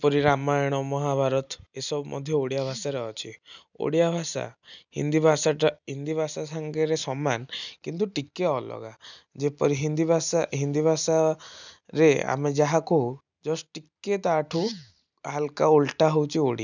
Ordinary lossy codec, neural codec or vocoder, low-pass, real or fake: none; none; 7.2 kHz; real